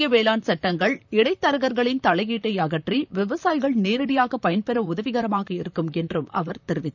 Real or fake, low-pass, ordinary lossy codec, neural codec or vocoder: fake; 7.2 kHz; none; vocoder, 44.1 kHz, 128 mel bands, Pupu-Vocoder